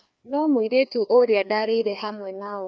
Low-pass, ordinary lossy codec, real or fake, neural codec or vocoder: none; none; fake; codec, 16 kHz, 2 kbps, FreqCodec, larger model